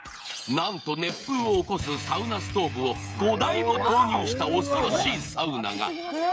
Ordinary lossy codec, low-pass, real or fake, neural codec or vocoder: none; none; fake; codec, 16 kHz, 16 kbps, FreqCodec, smaller model